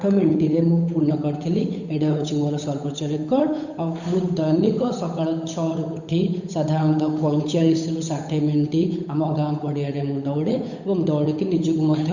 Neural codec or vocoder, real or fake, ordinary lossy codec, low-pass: codec, 16 kHz, 8 kbps, FunCodec, trained on Chinese and English, 25 frames a second; fake; none; 7.2 kHz